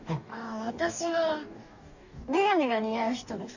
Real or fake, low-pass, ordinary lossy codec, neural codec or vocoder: fake; 7.2 kHz; none; codec, 44.1 kHz, 2.6 kbps, DAC